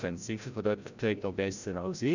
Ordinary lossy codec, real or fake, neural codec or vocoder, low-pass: none; fake; codec, 16 kHz, 0.5 kbps, FreqCodec, larger model; 7.2 kHz